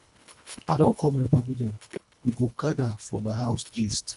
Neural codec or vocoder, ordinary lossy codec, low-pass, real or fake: codec, 24 kHz, 1.5 kbps, HILCodec; none; 10.8 kHz; fake